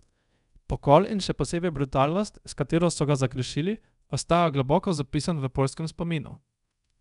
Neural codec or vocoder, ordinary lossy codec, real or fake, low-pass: codec, 24 kHz, 0.5 kbps, DualCodec; none; fake; 10.8 kHz